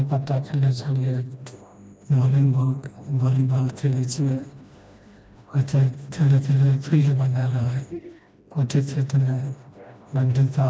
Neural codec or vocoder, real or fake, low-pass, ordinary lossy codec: codec, 16 kHz, 1 kbps, FreqCodec, smaller model; fake; none; none